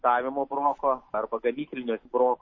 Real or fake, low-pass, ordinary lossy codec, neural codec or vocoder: real; 7.2 kHz; MP3, 32 kbps; none